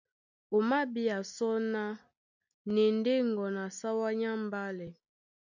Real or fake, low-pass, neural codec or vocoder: real; 7.2 kHz; none